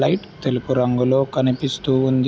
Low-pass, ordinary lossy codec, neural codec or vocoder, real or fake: none; none; none; real